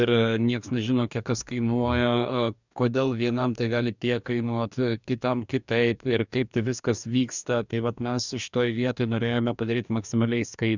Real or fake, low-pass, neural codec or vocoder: fake; 7.2 kHz; codec, 44.1 kHz, 2.6 kbps, DAC